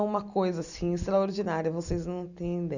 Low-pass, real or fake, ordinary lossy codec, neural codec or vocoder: 7.2 kHz; real; none; none